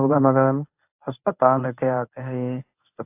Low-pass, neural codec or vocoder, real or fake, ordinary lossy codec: 3.6 kHz; codec, 24 kHz, 0.9 kbps, WavTokenizer, medium speech release version 1; fake; AAC, 32 kbps